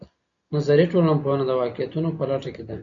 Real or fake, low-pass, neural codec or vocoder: real; 7.2 kHz; none